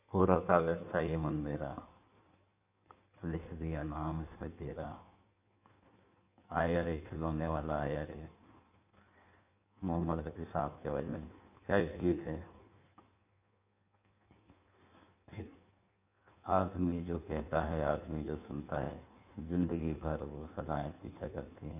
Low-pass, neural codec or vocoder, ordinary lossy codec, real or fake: 3.6 kHz; codec, 16 kHz in and 24 kHz out, 1.1 kbps, FireRedTTS-2 codec; none; fake